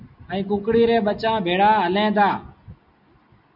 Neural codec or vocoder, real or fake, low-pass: none; real; 5.4 kHz